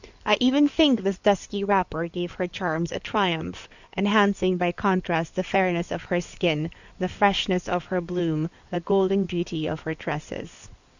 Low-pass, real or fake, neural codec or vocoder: 7.2 kHz; fake; codec, 16 kHz in and 24 kHz out, 2.2 kbps, FireRedTTS-2 codec